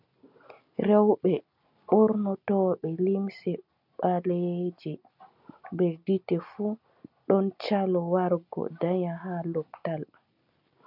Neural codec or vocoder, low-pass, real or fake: none; 5.4 kHz; real